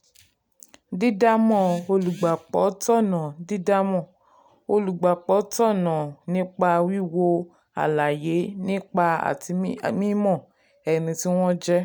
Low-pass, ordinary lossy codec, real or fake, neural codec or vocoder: none; none; real; none